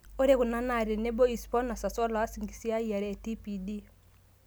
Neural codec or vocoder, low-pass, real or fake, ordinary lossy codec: none; none; real; none